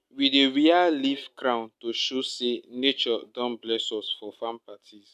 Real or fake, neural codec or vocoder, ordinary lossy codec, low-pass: real; none; none; 14.4 kHz